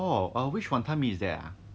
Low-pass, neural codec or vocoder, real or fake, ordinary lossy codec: none; none; real; none